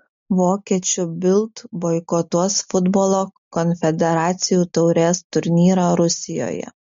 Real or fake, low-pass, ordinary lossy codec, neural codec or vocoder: real; 7.2 kHz; MP3, 48 kbps; none